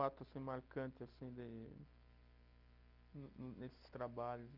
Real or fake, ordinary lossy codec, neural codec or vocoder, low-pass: real; Opus, 32 kbps; none; 5.4 kHz